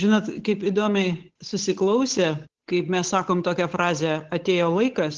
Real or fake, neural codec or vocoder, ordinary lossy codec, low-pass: fake; codec, 16 kHz, 8 kbps, FunCodec, trained on Chinese and English, 25 frames a second; Opus, 16 kbps; 7.2 kHz